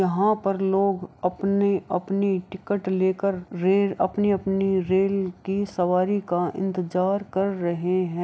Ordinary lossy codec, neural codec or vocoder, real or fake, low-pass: none; none; real; none